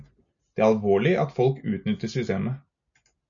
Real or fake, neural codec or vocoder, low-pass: real; none; 7.2 kHz